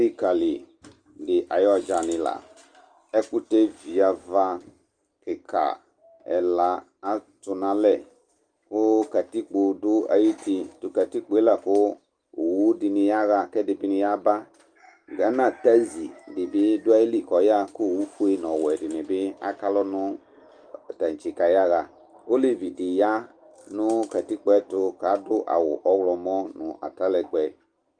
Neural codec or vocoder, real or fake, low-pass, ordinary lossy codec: none; real; 9.9 kHz; Opus, 32 kbps